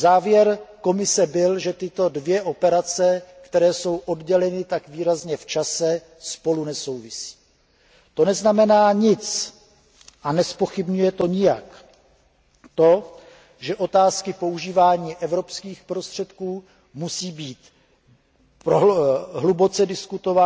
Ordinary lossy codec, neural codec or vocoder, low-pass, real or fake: none; none; none; real